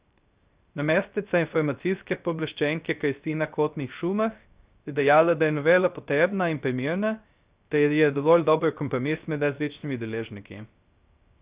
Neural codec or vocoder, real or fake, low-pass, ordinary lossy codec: codec, 16 kHz, 0.3 kbps, FocalCodec; fake; 3.6 kHz; Opus, 64 kbps